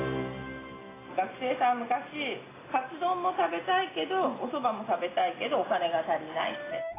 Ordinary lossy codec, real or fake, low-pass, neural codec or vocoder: AAC, 16 kbps; real; 3.6 kHz; none